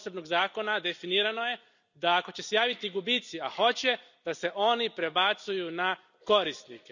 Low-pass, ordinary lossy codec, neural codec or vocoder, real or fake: 7.2 kHz; none; none; real